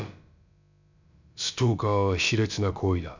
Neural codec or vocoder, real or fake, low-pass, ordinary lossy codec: codec, 16 kHz, about 1 kbps, DyCAST, with the encoder's durations; fake; 7.2 kHz; none